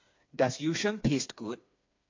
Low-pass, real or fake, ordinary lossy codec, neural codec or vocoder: 7.2 kHz; fake; MP3, 48 kbps; codec, 16 kHz, 4 kbps, FreqCodec, smaller model